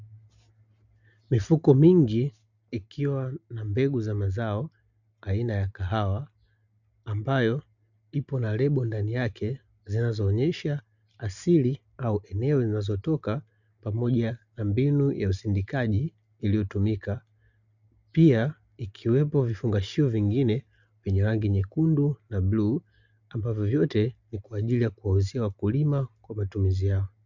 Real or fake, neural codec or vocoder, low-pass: real; none; 7.2 kHz